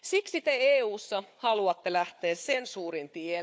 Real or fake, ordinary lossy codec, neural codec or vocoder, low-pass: fake; none; codec, 16 kHz, 4 kbps, FunCodec, trained on Chinese and English, 50 frames a second; none